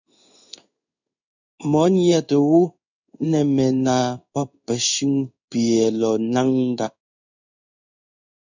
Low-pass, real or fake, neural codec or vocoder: 7.2 kHz; fake; codec, 16 kHz in and 24 kHz out, 1 kbps, XY-Tokenizer